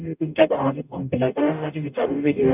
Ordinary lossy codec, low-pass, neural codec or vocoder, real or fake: none; 3.6 kHz; codec, 44.1 kHz, 0.9 kbps, DAC; fake